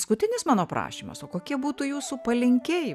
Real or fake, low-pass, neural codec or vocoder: real; 14.4 kHz; none